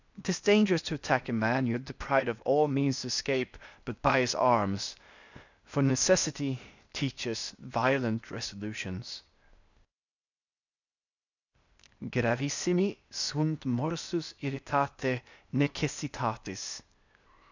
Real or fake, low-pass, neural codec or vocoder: fake; 7.2 kHz; codec, 16 kHz, 0.8 kbps, ZipCodec